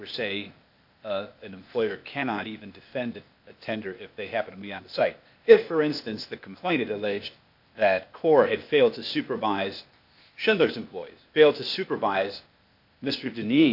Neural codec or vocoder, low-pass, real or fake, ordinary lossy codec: codec, 16 kHz, 0.8 kbps, ZipCodec; 5.4 kHz; fake; MP3, 48 kbps